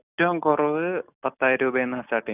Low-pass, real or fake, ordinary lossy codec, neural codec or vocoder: 3.6 kHz; real; none; none